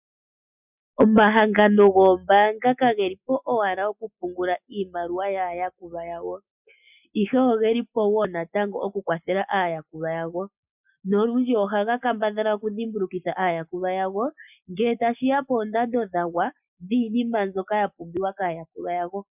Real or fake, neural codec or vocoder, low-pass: real; none; 3.6 kHz